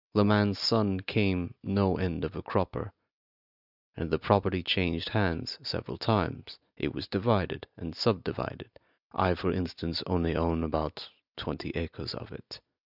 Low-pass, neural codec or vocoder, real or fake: 5.4 kHz; none; real